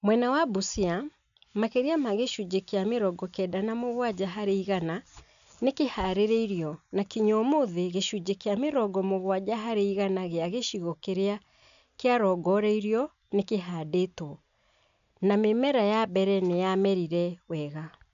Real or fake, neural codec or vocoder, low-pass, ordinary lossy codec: real; none; 7.2 kHz; none